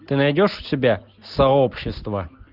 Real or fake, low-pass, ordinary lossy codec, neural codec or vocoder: real; 5.4 kHz; Opus, 24 kbps; none